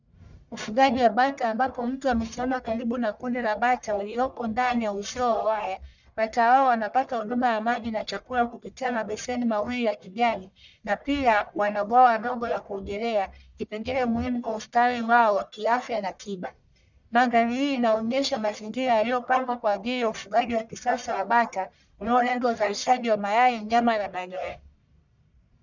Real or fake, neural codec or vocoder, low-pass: fake; codec, 44.1 kHz, 1.7 kbps, Pupu-Codec; 7.2 kHz